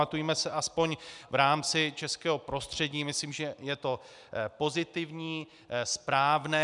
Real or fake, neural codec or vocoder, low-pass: real; none; 10.8 kHz